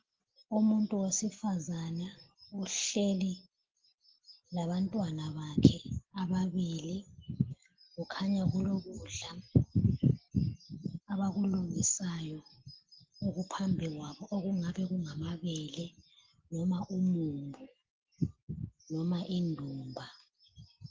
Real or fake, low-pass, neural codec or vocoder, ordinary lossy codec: real; 7.2 kHz; none; Opus, 16 kbps